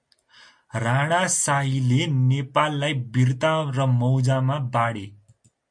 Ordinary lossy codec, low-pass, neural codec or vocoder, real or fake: MP3, 48 kbps; 9.9 kHz; none; real